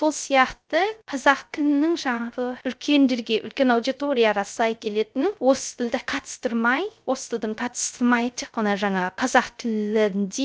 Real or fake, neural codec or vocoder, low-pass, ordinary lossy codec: fake; codec, 16 kHz, 0.3 kbps, FocalCodec; none; none